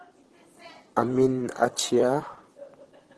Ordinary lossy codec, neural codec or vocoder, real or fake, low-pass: Opus, 16 kbps; vocoder, 44.1 kHz, 128 mel bands, Pupu-Vocoder; fake; 10.8 kHz